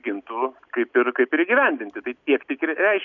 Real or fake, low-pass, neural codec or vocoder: real; 7.2 kHz; none